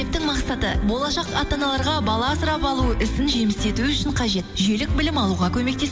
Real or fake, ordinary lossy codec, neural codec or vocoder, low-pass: real; none; none; none